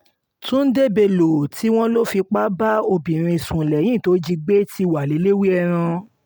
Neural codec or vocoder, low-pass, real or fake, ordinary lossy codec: none; none; real; none